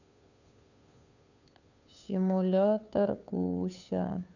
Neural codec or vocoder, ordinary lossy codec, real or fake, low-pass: codec, 16 kHz, 2 kbps, FunCodec, trained on Chinese and English, 25 frames a second; none; fake; 7.2 kHz